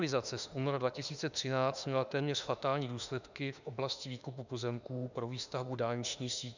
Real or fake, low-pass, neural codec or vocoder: fake; 7.2 kHz; autoencoder, 48 kHz, 32 numbers a frame, DAC-VAE, trained on Japanese speech